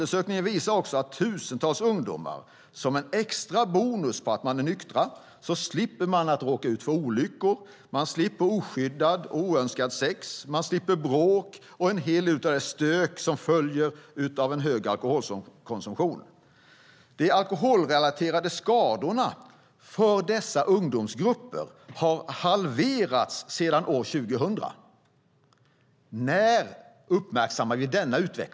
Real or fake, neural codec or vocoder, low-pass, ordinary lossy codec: real; none; none; none